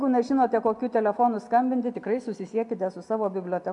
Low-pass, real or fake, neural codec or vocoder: 10.8 kHz; real; none